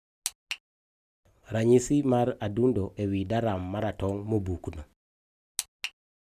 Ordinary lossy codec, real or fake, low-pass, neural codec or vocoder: none; real; 14.4 kHz; none